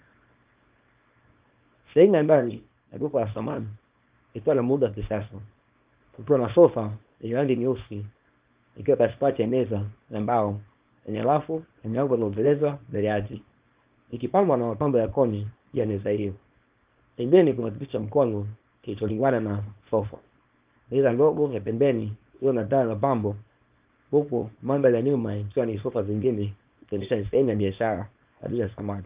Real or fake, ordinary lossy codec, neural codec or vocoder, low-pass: fake; Opus, 24 kbps; codec, 24 kHz, 0.9 kbps, WavTokenizer, small release; 3.6 kHz